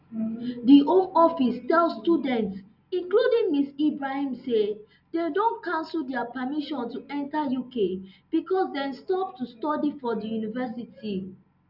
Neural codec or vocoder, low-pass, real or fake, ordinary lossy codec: none; 5.4 kHz; real; none